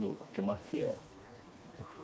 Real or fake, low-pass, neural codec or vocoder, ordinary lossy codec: fake; none; codec, 16 kHz, 2 kbps, FreqCodec, smaller model; none